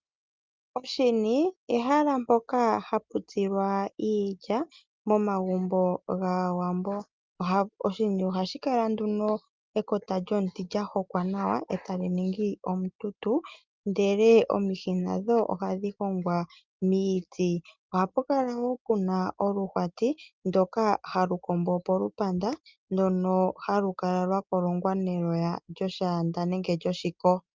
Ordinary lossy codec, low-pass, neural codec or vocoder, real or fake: Opus, 24 kbps; 7.2 kHz; none; real